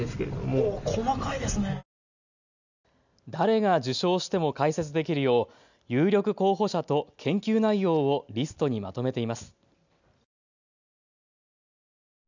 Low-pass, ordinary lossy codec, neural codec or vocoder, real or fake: 7.2 kHz; none; none; real